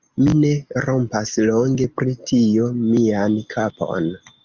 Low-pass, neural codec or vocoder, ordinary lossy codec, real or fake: 7.2 kHz; none; Opus, 32 kbps; real